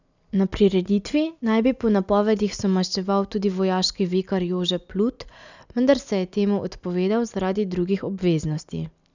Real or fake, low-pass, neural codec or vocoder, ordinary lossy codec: real; 7.2 kHz; none; none